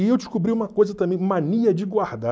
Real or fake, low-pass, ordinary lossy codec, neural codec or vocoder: real; none; none; none